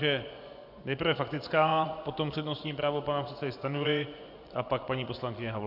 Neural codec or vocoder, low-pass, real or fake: vocoder, 24 kHz, 100 mel bands, Vocos; 5.4 kHz; fake